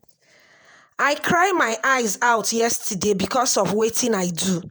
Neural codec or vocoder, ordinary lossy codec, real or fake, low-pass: vocoder, 48 kHz, 128 mel bands, Vocos; none; fake; none